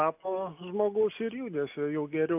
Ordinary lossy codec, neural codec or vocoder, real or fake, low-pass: AAC, 32 kbps; none; real; 3.6 kHz